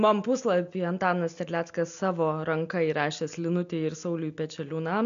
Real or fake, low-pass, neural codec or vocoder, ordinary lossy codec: real; 7.2 kHz; none; MP3, 64 kbps